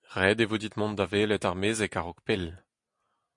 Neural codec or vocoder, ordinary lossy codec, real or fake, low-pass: none; MP3, 64 kbps; real; 10.8 kHz